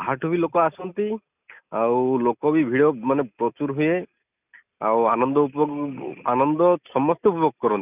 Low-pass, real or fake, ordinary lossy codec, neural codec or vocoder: 3.6 kHz; real; none; none